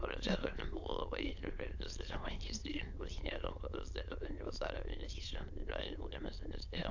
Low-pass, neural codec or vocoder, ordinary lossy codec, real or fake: 7.2 kHz; autoencoder, 22.05 kHz, a latent of 192 numbers a frame, VITS, trained on many speakers; AAC, 48 kbps; fake